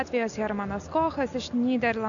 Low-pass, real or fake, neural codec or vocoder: 7.2 kHz; real; none